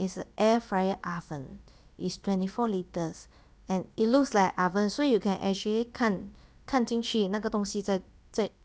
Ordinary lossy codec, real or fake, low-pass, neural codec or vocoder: none; fake; none; codec, 16 kHz, about 1 kbps, DyCAST, with the encoder's durations